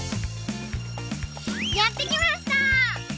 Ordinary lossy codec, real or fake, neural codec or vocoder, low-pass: none; real; none; none